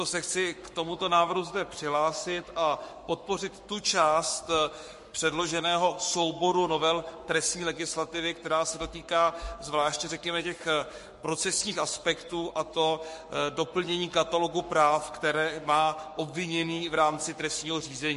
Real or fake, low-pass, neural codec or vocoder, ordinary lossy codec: fake; 14.4 kHz; codec, 44.1 kHz, 7.8 kbps, Pupu-Codec; MP3, 48 kbps